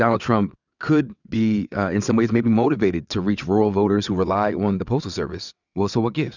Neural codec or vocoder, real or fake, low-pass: vocoder, 22.05 kHz, 80 mel bands, WaveNeXt; fake; 7.2 kHz